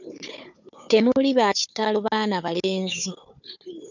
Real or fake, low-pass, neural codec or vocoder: fake; 7.2 kHz; codec, 16 kHz, 4 kbps, FunCodec, trained on Chinese and English, 50 frames a second